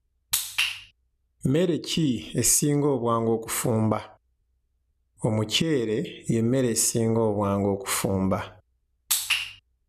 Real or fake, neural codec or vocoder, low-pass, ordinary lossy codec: real; none; 14.4 kHz; none